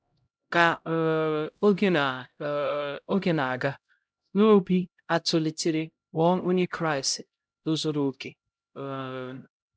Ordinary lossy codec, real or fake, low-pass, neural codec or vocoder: none; fake; none; codec, 16 kHz, 0.5 kbps, X-Codec, HuBERT features, trained on LibriSpeech